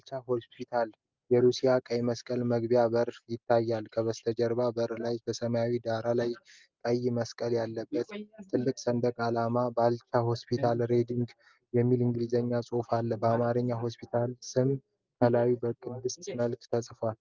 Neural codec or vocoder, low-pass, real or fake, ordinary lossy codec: none; 7.2 kHz; real; Opus, 24 kbps